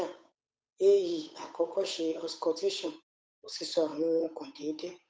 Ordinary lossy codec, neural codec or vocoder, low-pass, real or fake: none; codec, 16 kHz, 8 kbps, FunCodec, trained on Chinese and English, 25 frames a second; none; fake